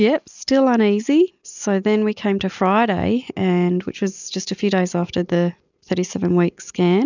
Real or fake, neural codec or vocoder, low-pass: real; none; 7.2 kHz